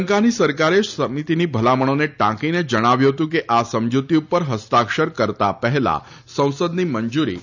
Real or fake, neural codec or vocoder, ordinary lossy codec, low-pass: real; none; none; 7.2 kHz